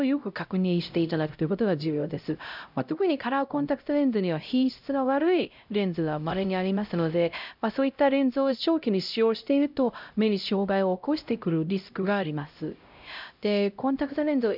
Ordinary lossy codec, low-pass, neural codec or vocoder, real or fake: none; 5.4 kHz; codec, 16 kHz, 0.5 kbps, X-Codec, HuBERT features, trained on LibriSpeech; fake